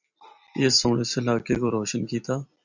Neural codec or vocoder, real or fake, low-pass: vocoder, 44.1 kHz, 128 mel bands every 256 samples, BigVGAN v2; fake; 7.2 kHz